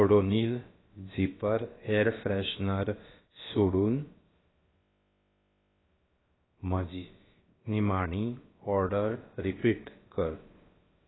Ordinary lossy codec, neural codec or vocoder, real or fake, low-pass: AAC, 16 kbps; codec, 16 kHz, about 1 kbps, DyCAST, with the encoder's durations; fake; 7.2 kHz